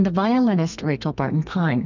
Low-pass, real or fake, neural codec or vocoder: 7.2 kHz; fake; codec, 32 kHz, 1.9 kbps, SNAC